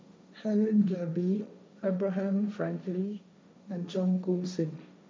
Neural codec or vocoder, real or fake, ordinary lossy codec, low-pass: codec, 16 kHz, 1.1 kbps, Voila-Tokenizer; fake; none; none